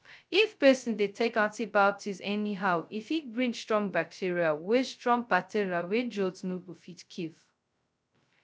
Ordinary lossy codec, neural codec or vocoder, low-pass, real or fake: none; codec, 16 kHz, 0.2 kbps, FocalCodec; none; fake